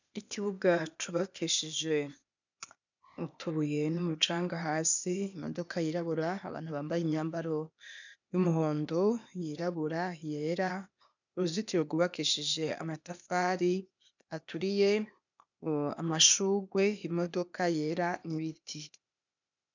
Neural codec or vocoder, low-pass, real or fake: codec, 16 kHz, 0.8 kbps, ZipCodec; 7.2 kHz; fake